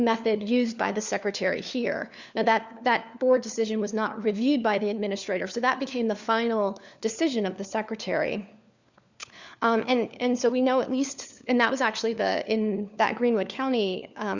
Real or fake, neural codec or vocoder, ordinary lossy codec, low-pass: fake; codec, 16 kHz, 4 kbps, FunCodec, trained on LibriTTS, 50 frames a second; Opus, 64 kbps; 7.2 kHz